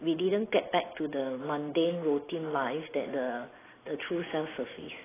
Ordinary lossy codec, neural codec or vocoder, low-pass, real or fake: AAC, 16 kbps; none; 3.6 kHz; real